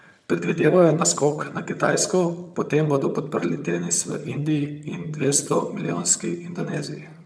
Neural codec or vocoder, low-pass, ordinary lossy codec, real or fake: vocoder, 22.05 kHz, 80 mel bands, HiFi-GAN; none; none; fake